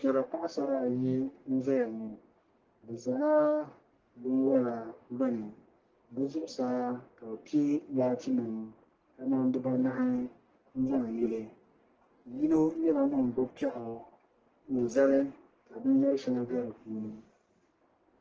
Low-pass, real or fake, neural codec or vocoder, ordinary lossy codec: 7.2 kHz; fake; codec, 44.1 kHz, 1.7 kbps, Pupu-Codec; Opus, 16 kbps